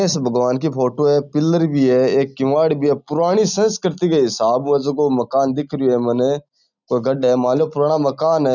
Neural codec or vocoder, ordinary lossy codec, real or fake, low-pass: none; none; real; 7.2 kHz